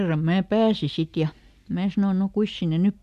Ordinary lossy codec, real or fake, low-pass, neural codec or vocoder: none; real; 14.4 kHz; none